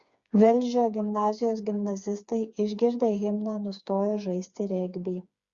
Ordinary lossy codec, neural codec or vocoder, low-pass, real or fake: Opus, 64 kbps; codec, 16 kHz, 4 kbps, FreqCodec, smaller model; 7.2 kHz; fake